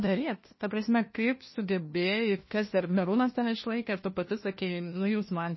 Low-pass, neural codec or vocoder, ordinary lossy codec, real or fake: 7.2 kHz; codec, 16 kHz, 1 kbps, FunCodec, trained on LibriTTS, 50 frames a second; MP3, 24 kbps; fake